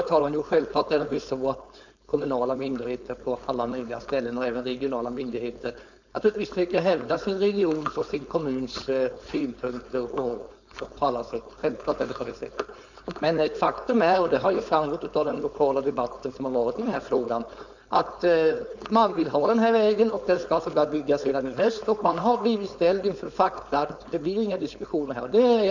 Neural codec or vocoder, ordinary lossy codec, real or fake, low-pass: codec, 16 kHz, 4.8 kbps, FACodec; none; fake; 7.2 kHz